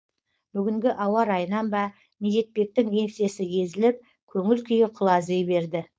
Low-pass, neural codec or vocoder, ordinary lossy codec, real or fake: none; codec, 16 kHz, 4.8 kbps, FACodec; none; fake